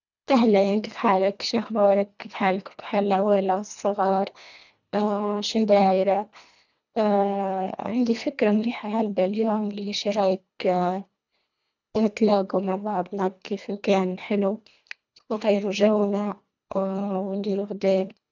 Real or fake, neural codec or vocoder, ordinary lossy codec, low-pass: fake; codec, 24 kHz, 1.5 kbps, HILCodec; none; 7.2 kHz